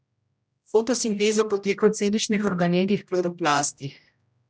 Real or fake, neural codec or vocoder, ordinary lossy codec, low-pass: fake; codec, 16 kHz, 0.5 kbps, X-Codec, HuBERT features, trained on general audio; none; none